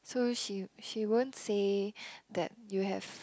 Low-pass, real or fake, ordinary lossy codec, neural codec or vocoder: none; real; none; none